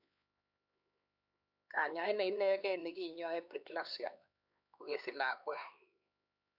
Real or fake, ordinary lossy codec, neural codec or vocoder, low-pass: fake; none; codec, 16 kHz, 4 kbps, X-Codec, HuBERT features, trained on LibriSpeech; 5.4 kHz